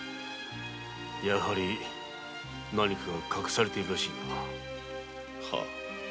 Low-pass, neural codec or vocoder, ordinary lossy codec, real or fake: none; none; none; real